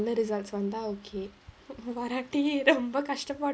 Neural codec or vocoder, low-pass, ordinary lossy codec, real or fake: none; none; none; real